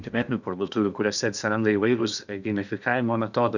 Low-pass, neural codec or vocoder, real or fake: 7.2 kHz; codec, 16 kHz in and 24 kHz out, 0.8 kbps, FocalCodec, streaming, 65536 codes; fake